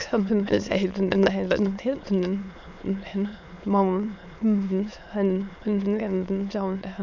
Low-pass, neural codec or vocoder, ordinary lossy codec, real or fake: 7.2 kHz; autoencoder, 22.05 kHz, a latent of 192 numbers a frame, VITS, trained on many speakers; none; fake